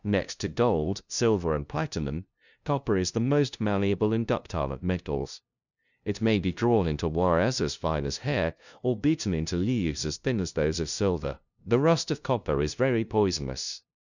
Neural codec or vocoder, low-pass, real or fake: codec, 16 kHz, 0.5 kbps, FunCodec, trained on LibriTTS, 25 frames a second; 7.2 kHz; fake